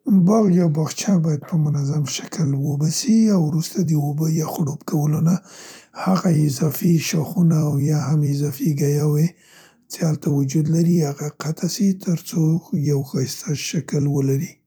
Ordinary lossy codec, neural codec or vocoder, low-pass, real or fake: none; vocoder, 44.1 kHz, 128 mel bands every 256 samples, BigVGAN v2; none; fake